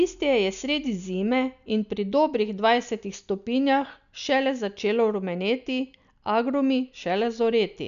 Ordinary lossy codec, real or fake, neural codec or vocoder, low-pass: none; real; none; 7.2 kHz